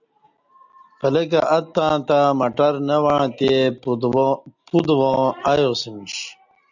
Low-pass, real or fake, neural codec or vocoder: 7.2 kHz; real; none